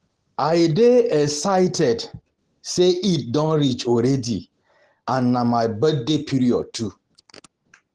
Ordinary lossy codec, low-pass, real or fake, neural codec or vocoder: Opus, 16 kbps; 10.8 kHz; real; none